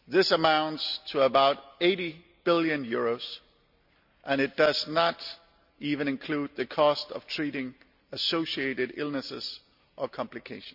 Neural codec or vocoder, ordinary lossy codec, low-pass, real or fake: none; none; 5.4 kHz; real